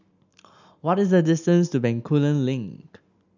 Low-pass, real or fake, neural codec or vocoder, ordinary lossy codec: 7.2 kHz; real; none; none